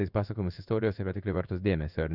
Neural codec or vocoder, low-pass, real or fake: codec, 16 kHz in and 24 kHz out, 1 kbps, XY-Tokenizer; 5.4 kHz; fake